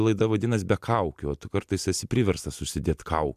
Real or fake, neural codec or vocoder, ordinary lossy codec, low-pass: real; none; MP3, 96 kbps; 14.4 kHz